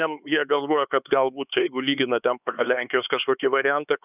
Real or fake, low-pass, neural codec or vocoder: fake; 3.6 kHz; codec, 16 kHz, 4 kbps, X-Codec, HuBERT features, trained on LibriSpeech